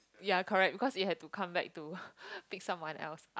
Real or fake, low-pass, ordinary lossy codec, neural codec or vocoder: real; none; none; none